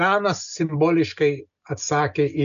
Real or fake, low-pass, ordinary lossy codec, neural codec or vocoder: real; 7.2 kHz; MP3, 96 kbps; none